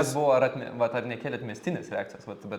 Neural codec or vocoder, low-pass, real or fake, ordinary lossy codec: none; 19.8 kHz; real; Opus, 64 kbps